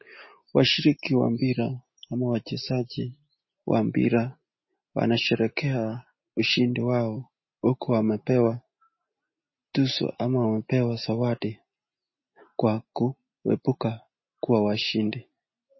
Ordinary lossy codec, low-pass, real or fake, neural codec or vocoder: MP3, 24 kbps; 7.2 kHz; real; none